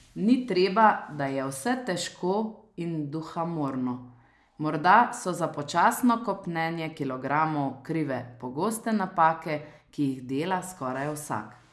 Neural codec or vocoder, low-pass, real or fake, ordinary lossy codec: none; none; real; none